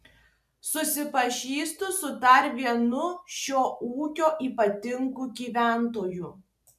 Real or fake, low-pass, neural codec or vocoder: real; 14.4 kHz; none